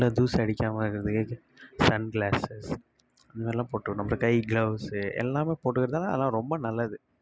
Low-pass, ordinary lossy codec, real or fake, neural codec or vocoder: none; none; real; none